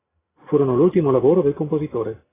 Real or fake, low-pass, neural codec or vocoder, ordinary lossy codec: real; 3.6 kHz; none; AAC, 16 kbps